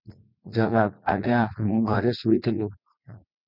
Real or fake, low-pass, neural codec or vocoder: fake; 5.4 kHz; vocoder, 44.1 kHz, 80 mel bands, Vocos